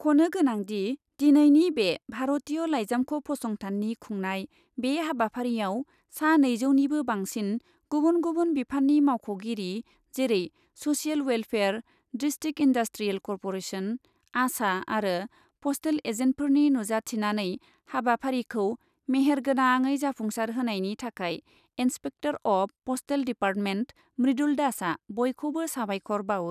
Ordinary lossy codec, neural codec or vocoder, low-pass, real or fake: none; none; 14.4 kHz; real